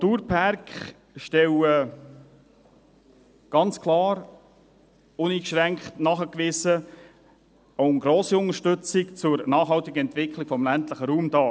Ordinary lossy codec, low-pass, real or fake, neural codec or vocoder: none; none; real; none